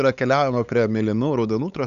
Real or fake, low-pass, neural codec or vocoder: fake; 7.2 kHz; codec, 16 kHz, 8 kbps, FunCodec, trained on LibriTTS, 25 frames a second